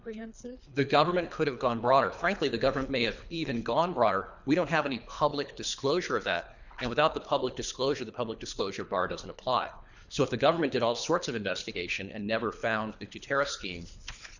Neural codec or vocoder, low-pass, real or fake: codec, 24 kHz, 3 kbps, HILCodec; 7.2 kHz; fake